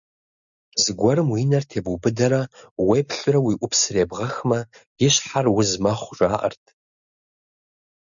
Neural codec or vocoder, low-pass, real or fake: none; 7.2 kHz; real